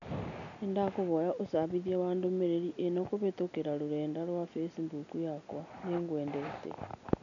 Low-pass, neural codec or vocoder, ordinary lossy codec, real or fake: 7.2 kHz; none; none; real